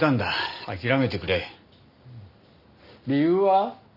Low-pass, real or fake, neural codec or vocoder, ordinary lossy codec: 5.4 kHz; real; none; none